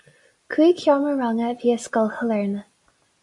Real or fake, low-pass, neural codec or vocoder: real; 10.8 kHz; none